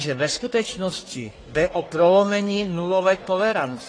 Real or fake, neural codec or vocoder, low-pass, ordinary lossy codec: fake; codec, 44.1 kHz, 1.7 kbps, Pupu-Codec; 9.9 kHz; AAC, 32 kbps